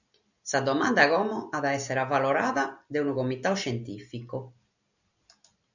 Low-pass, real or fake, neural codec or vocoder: 7.2 kHz; real; none